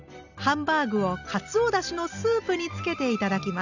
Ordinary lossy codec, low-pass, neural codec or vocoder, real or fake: none; 7.2 kHz; none; real